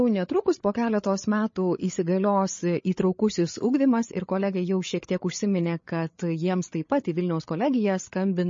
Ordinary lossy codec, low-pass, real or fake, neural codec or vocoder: MP3, 32 kbps; 7.2 kHz; fake; codec, 16 kHz, 8 kbps, FreqCodec, larger model